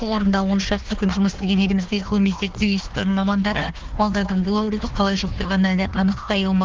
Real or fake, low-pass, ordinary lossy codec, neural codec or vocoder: fake; 7.2 kHz; Opus, 16 kbps; codec, 16 kHz, 1 kbps, FunCodec, trained on Chinese and English, 50 frames a second